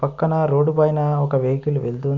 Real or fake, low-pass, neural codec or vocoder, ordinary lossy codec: real; 7.2 kHz; none; none